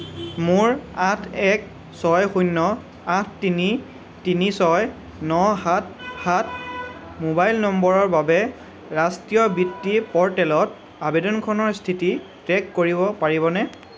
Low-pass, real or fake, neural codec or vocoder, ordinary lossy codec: none; real; none; none